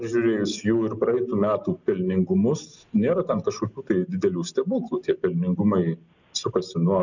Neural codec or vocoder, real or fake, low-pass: none; real; 7.2 kHz